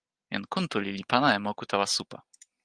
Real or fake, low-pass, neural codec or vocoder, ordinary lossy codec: real; 9.9 kHz; none; Opus, 24 kbps